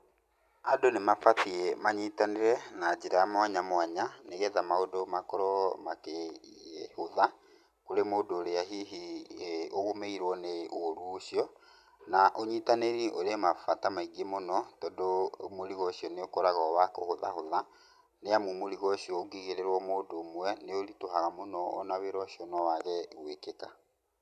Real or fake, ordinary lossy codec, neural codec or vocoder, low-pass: real; none; none; 14.4 kHz